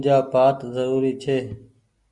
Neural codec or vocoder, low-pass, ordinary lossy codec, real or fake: none; 10.8 kHz; Opus, 64 kbps; real